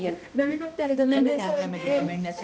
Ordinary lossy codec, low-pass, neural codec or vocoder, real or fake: none; none; codec, 16 kHz, 1 kbps, X-Codec, HuBERT features, trained on balanced general audio; fake